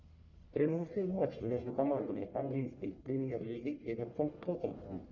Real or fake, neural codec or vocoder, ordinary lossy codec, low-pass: fake; codec, 44.1 kHz, 1.7 kbps, Pupu-Codec; Opus, 64 kbps; 7.2 kHz